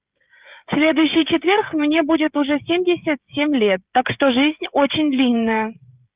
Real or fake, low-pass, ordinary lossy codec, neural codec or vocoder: fake; 3.6 kHz; Opus, 24 kbps; codec, 16 kHz, 16 kbps, FreqCodec, smaller model